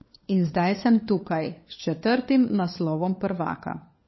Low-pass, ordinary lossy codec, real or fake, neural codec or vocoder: 7.2 kHz; MP3, 24 kbps; fake; codec, 16 kHz, 4 kbps, FunCodec, trained on LibriTTS, 50 frames a second